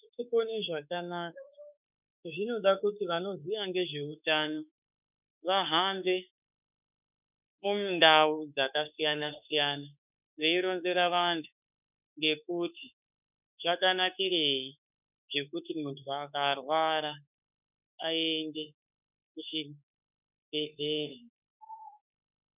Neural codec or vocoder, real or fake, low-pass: autoencoder, 48 kHz, 32 numbers a frame, DAC-VAE, trained on Japanese speech; fake; 3.6 kHz